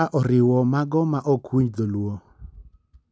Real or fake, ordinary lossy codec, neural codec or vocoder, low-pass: real; none; none; none